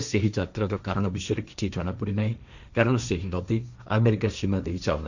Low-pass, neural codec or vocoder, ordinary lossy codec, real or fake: 7.2 kHz; codec, 16 kHz, 1.1 kbps, Voila-Tokenizer; none; fake